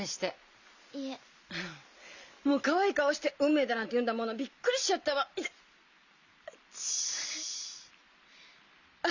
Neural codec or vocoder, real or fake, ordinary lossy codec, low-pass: none; real; none; 7.2 kHz